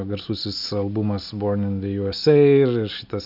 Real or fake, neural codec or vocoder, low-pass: real; none; 5.4 kHz